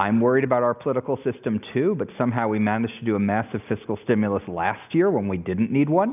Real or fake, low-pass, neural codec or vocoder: real; 3.6 kHz; none